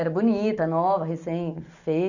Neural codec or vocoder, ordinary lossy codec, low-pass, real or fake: none; none; 7.2 kHz; real